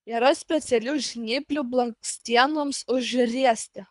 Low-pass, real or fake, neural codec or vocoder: 10.8 kHz; fake; codec, 24 kHz, 3 kbps, HILCodec